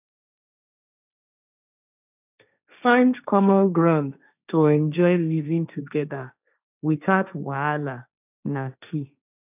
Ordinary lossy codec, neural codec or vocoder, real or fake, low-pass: none; codec, 16 kHz, 1.1 kbps, Voila-Tokenizer; fake; 3.6 kHz